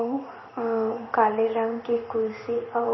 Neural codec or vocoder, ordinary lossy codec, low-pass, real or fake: codec, 16 kHz in and 24 kHz out, 1 kbps, XY-Tokenizer; MP3, 24 kbps; 7.2 kHz; fake